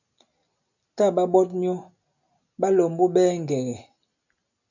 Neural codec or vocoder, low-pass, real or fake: none; 7.2 kHz; real